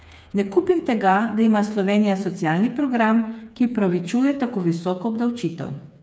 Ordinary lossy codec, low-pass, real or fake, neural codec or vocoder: none; none; fake; codec, 16 kHz, 4 kbps, FreqCodec, smaller model